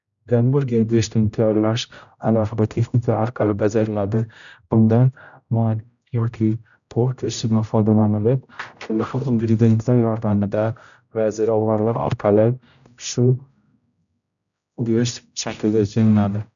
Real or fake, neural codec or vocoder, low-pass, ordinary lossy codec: fake; codec, 16 kHz, 0.5 kbps, X-Codec, HuBERT features, trained on general audio; 7.2 kHz; none